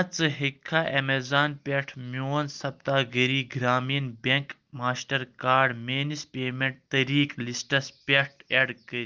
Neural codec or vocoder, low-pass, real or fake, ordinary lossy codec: none; 7.2 kHz; real; Opus, 32 kbps